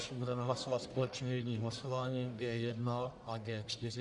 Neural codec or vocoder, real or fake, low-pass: codec, 44.1 kHz, 1.7 kbps, Pupu-Codec; fake; 10.8 kHz